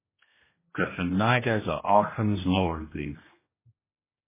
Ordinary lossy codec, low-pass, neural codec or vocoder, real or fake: MP3, 16 kbps; 3.6 kHz; codec, 16 kHz, 1 kbps, X-Codec, HuBERT features, trained on general audio; fake